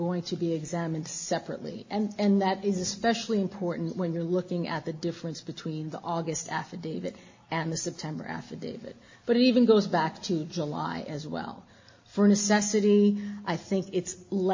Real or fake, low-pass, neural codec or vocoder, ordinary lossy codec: real; 7.2 kHz; none; MP3, 32 kbps